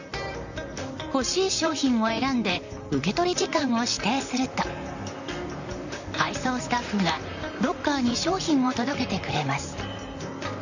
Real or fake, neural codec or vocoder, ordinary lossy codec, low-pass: fake; vocoder, 44.1 kHz, 128 mel bands, Pupu-Vocoder; none; 7.2 kHz